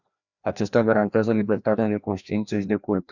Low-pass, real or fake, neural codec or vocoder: 7.2 kHz; fake; codec, 16 kHz, 1 kbps, FreqCodec, larger model